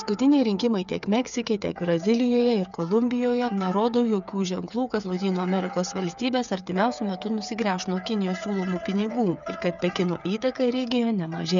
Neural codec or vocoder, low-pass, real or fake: codec, 16 kHz, 8 kbps, FreqCodec, smaller model; 7.2 kHz; fake